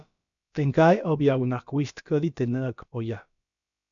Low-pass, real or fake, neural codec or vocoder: 7.2 kHz; fake; codec, 16 kHz, about 1 kbps, DyCAST, with the encoder's durations